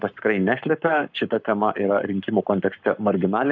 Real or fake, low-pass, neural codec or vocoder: fake; 7.2 kHz; codec, 44.1 kHz, 7.8 kbps, Pupu-Codec